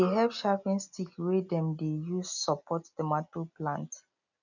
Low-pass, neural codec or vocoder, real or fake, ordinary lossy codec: 7.2 kHz; none; real; none